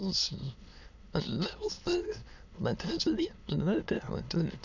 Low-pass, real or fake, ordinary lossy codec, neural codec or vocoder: 7.2 kHz; fake; none; autoencoder, 22.05 kHz, a latent of 192 numbers a frame, VITS, trained on many speakers